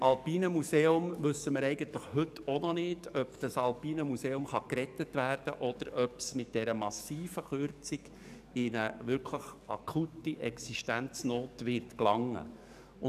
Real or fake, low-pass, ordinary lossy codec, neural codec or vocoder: fake; 14.4 kHz; none; codec, 44.1 kHz, 7.8 kbps, DAC